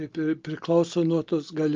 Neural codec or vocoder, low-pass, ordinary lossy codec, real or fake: none; 7.2 kHz; Opus, 24 kbps; real